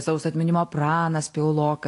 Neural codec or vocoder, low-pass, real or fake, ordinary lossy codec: none; 10.8 kHz; real; AAC, 48 kbps